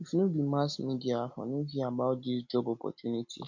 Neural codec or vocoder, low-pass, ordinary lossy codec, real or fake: none; 7.2 kHz; MP3, 48 kbps; real